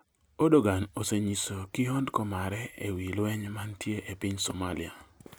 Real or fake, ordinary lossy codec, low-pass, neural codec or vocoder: real; none; none; none